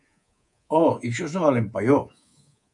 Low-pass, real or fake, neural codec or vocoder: 10.8 kHz; fake; codec, 24 kHz, 3.1 kbps, DualCodec